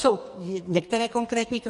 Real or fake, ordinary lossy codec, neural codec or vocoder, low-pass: fake; MP3, 48 kbps; codec, 44.1 kHz, 2.6 kbps, SNAC; 14.4 kHz